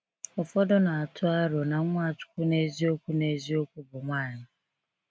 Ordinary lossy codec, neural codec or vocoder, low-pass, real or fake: none; none; none; real